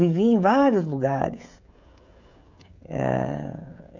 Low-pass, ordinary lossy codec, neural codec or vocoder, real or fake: 7.2 kHz; MP3, 64 kbps; codec, 16 kHz, 16 kbps, FreqCodec, smaller model; fake